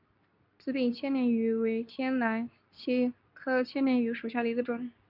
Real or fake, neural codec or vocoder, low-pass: fake; codec, 24 kHz, 0.9 kbps, WavTokenizer, medium speech release version 2; 5.4 kHz